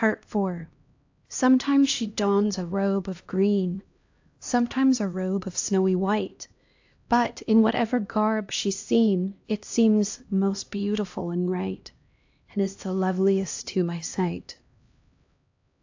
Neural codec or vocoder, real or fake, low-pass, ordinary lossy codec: codec, 16 kHz, 1 kbps, X-Codec, HuBERT features, trained on LibriSpeech; fake; 7.2 kHz; AAC, 48 kbps